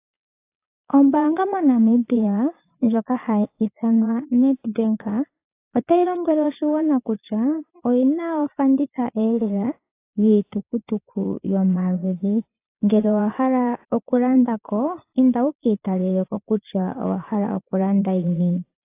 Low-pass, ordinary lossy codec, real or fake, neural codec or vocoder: 3.6 kHz; AAC, 24 kbps; fake; vocoder, 22.05 kHz, 80 mel bands, Vocos